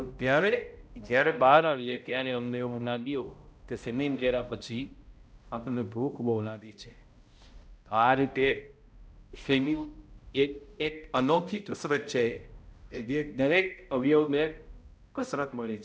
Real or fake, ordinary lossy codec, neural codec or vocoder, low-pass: fake; none; codec, 16 kHz, 0.5 kbps, X-Codec, HuBERT features, trained on balanced general audio; none